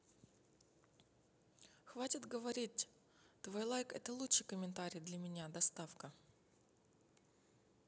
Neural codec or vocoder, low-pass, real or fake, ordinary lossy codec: none; none; real; none